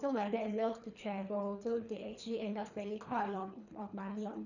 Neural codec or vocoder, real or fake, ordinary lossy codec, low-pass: codec, 24 kHz, 3 kbps, HILCodec; fake; none; 7.2 kHz